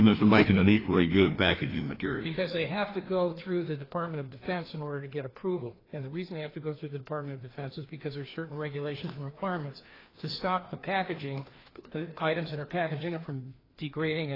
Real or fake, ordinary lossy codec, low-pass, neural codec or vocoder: fake; AAC, 24 kbps; 5.4 kHz; codec, 16 kHz, 2 kbps, FreqCodec, larger model